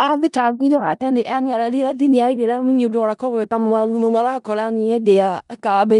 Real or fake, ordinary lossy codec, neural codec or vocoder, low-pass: fake; none; codec, 16 kHz in and 24 kHz out, 0.4 kbps, LongCat-Audio-Codec, four codebook decoder; 10.8 kHz